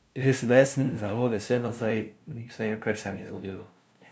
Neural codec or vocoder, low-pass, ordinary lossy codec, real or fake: codec, 16 kHz, 0.5 kbps, FunCodec, trained on LibriTTS, 25 frames a second; none; none; fake